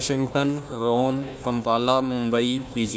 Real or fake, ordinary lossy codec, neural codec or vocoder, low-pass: fake; none; codec, 16 kHz, 1 kbps, FunCodec, trained on Chinese and English, 50 frames a second; none